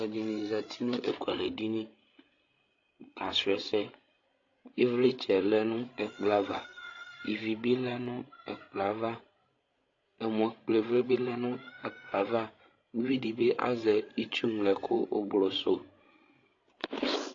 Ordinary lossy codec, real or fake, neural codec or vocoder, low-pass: AAC, 32 kbps; fake; codec, 16 kHz, 16 kbps, FreqCodec, larger model; 7.2 kHz